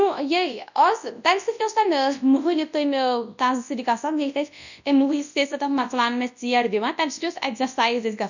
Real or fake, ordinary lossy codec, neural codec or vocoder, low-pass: fake; none; codec, 24 kHz, 0.9 kbps, WavTokenizer, large speech release; 7.2 kHz